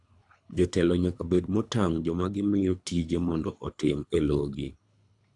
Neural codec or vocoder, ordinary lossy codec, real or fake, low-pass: codec, 24 kHz, 3 kbps, HILCodec; AAC, 64 kbps; fake; 10.8 kHz